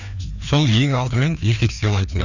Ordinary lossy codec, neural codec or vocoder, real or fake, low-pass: none; codec, 16 kHz, 2 kbps, FreqCodec, larger model; fake; 7.2 kHz